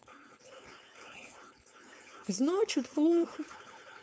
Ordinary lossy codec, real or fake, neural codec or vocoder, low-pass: none; fake; codec, 16 kHz, 4.8 kbps, FACodec; none